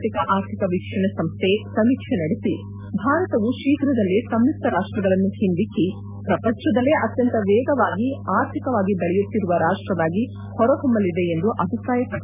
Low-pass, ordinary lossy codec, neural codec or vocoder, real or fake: 3.6 kHz; none; none; real